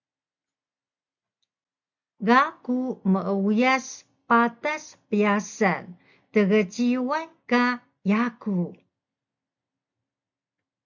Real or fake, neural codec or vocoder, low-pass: real; none; 7.2 kHz